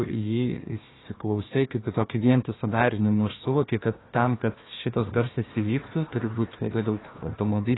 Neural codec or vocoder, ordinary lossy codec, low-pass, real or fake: codec, 16 kHz, 1 kbps, FunCodec, trained on Chinese and English, 50 frames a second; AAC, 16 kbps; 7.2 kHz; fake